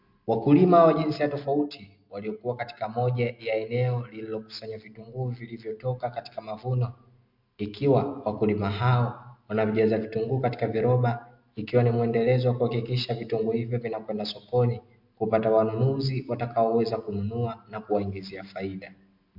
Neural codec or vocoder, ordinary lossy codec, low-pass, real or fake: none; MP3, 48 kbps; 5.4 kHz; real